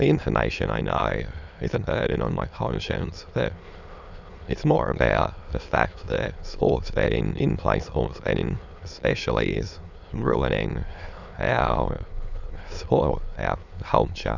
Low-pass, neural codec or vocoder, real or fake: 7.2 kHz; autoencoder, 22.05 kHz, a latent of 192 numbers a frame, VITS, trained on many speakers; fake